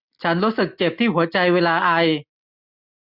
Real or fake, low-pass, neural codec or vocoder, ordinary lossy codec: real; 5.4 kHz; none; none